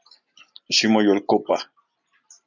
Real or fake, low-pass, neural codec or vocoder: real; 7.2 kHz; none